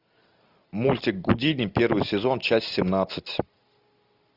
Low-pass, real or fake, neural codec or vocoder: 5.4 kHz; real; none